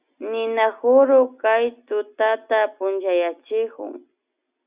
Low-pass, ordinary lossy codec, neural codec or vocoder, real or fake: 3.6 kHz; Opus, 64 kbps; none; real